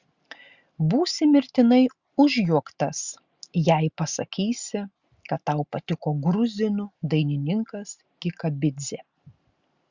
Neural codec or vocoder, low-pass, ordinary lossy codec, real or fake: none; 7.2 kHz; Opus, 64 kbps; real